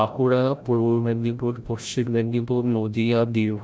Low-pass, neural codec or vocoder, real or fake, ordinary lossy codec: none; codec, 16 kHz, 0.5 kbps, FreqCodec, larger model; fake; none